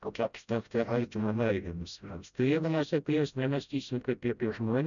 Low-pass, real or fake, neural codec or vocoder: 7.2 kHz; fake; codec, 16 kHz, 0.5 kbps, FreqCodec, smaller model